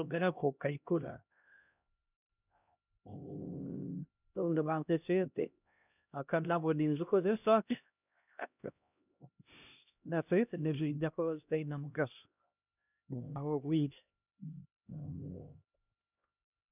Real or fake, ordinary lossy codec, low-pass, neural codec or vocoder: fake; none; 3.6 kHz; codec, 16 kHz, 1 kbps, X-Codec, HuBERT features, trained on LibriSpeech